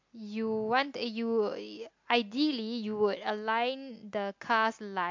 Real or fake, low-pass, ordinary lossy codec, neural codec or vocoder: real; 7.2 kHz; MP3, 48 kbps; none